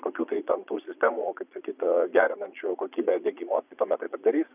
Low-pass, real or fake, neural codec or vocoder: 3.6 kHz; fake; vocoder, 22.05 kHz, 80 mel bands, Vocos